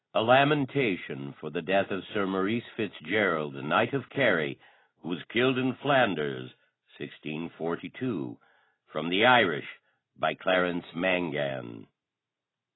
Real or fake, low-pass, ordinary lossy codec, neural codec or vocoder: real; 7.2 kHz; AAC, 16 kbps; none